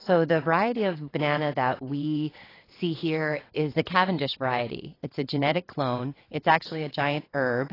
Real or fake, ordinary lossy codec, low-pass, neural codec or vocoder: fake; AAC, 24 kbps; 5.4 kHz; vocoder, 22.05 kHz, 80 mel bands, WaveNeXt